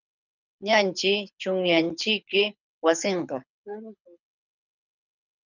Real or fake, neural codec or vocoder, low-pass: fake; codec, 24 kHz, 6 kbps, HILCodec; 7.2 kHz